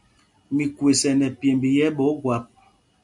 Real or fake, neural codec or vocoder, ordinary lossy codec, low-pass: real; none; MP3, 64 kbps; 10.8 kHz